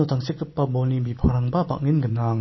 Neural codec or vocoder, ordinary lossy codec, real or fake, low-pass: none; MP3, 24 kbps; real; 7.2 kHz